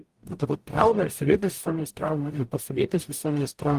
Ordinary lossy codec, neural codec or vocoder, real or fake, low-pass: Opus, 24 kbps; codec, 44.1 kHz, 0.9 kbps, DAC; fake; 14.4 kHz